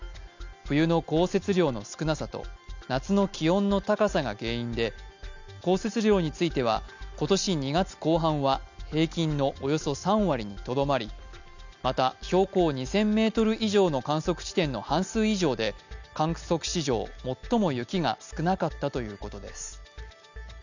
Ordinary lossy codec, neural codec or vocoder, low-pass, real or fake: none; none; 7.2 kHz; real